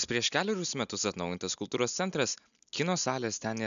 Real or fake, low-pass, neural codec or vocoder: real; 7.2 kHz; none